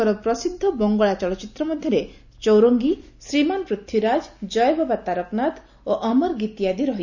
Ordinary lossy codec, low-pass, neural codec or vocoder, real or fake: none; 7.2 kHz; none; real